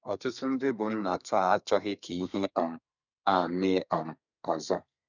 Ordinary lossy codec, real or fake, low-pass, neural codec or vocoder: none; fake; 7.2 kHz; codec, 32 kHz, 1.9 kbps, SNAC